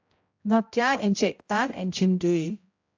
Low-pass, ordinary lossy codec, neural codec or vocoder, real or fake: 7.2 kHz; AAC, 48 kbps; codec, 16 kHz, 0.5 kbps, X-Codec, HuBERT features, trained on general audio; fake